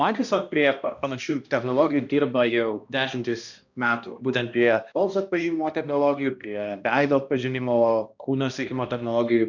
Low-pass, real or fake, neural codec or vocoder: 7.2 kHz; fake; codec, 16 kHz, 1 kbps, X-Codec, HuBERT features, trained on balanced general audio